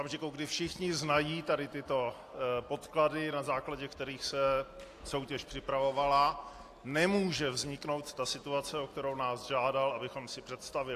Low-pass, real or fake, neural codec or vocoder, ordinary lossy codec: 14.4 kHz; real; none; AAC, 64 kbps